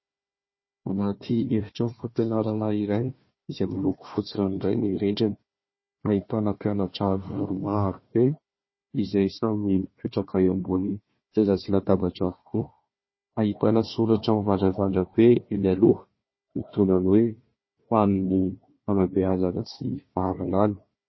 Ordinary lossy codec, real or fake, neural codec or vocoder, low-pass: MP3, 24 kbps; fake; codec, 16 kHz, 1 kbps, FunCodec, trained on Chinese and English, 50 frames a second; 7.2 kHz